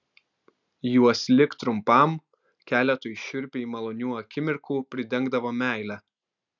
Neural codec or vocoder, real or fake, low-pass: none; real; 7.2 kHz